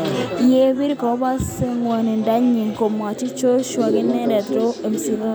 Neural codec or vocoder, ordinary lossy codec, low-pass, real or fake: none; none; none; real